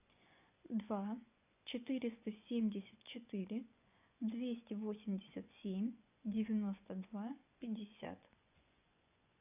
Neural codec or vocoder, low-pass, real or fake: vocoder, 24 kHz, 100 mel bands, Vocos; 3.6 kHz; fake